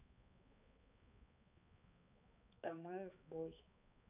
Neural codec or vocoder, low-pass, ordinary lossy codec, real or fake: codec, 16 kHz, 2 kbps, X-Codec, HuBERT features, trained on balanced general audio; 3.6 kHz; none; fake